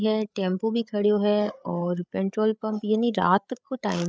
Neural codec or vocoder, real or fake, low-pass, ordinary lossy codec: codec, 16 kHz, 8 kbps, FreqCodec, larger model; fake; none; none